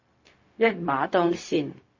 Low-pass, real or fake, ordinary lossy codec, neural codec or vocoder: 7.2 kHz; fake; MP3, 32 kbps; codec, 16 kHz, 0.4 kbps, LongCat-Audio-Codec